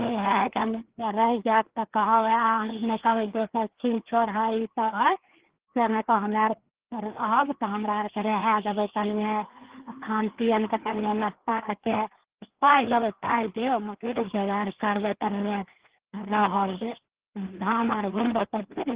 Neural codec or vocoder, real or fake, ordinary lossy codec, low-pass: codec, 16 kHz, 2 kbps, FreqCodec, larger model; fake; Opus, 16 kbps; 3.6 kHz